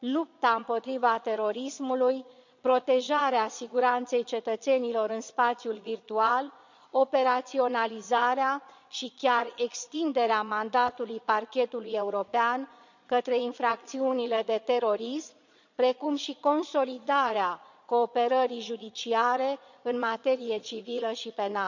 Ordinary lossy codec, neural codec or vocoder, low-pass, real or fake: none; vocoder, 22.05 kHz, 80 mel bands, Vocos; 7.2 kHz; fake